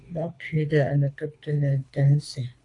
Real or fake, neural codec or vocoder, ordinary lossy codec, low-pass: fake; codec, 24 kHz, 3 kbps, HILCodec; AAC, 48 kbps; 10.8 kHz